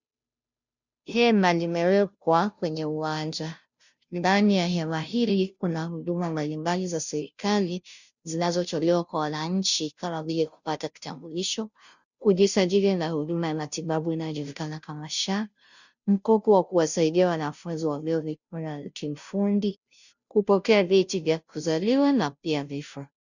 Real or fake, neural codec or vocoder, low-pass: fake; codec, 16 kHz, 0.5 kbps, FunCodec, trained on Chinese and English, 25 frames a second; 7.2 kHz